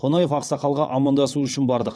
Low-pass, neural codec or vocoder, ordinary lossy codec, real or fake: none; vocoder, 22.05 kHz, 80 mel bands, WaveNeXt; none; fake